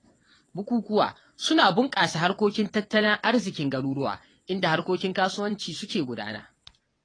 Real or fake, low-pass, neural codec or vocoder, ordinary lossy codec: fake; 9.9 kHz; vocoder, 22.05 kHz, 80 mel bands, WaveNeXt; AAC, 32 kbps